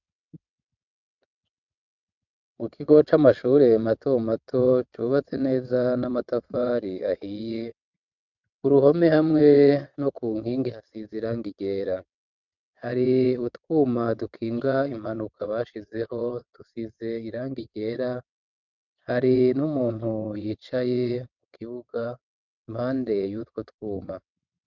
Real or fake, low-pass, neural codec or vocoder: fake; 7.2 kHz; vocoder, 22.05 kHz, 80 mel bands, WaveNeXt